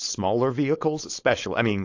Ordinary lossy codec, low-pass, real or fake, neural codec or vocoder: AAC, 48 kbps; 7.2 kHz; fake; codec, 16 kHz, 4.8 kbps, FACodec